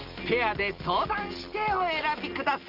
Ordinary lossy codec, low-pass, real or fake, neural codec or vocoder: Opus, 32 kbps; 5.4 kHz; real; none